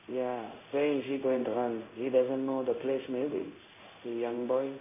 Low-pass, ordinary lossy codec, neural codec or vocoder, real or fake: 3.6 kHz; MP3, 24 kbps; codec, 16 kHz in and 24 kHz out, 1 kbps, XY-Tokenizer; fake